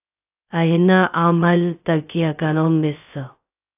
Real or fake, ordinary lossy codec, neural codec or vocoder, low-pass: fake; AAC, 24 kbps; codec, 16 kHz, 0.2 kbps, FocalCodec; 3.6 kHz